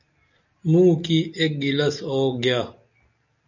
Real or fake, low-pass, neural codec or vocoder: real; 7.2 kHz; none